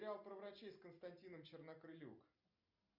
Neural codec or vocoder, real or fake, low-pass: none; real; 5.4 kHz